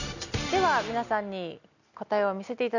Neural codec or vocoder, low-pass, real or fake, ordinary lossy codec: none; 7.2 kHz; real; none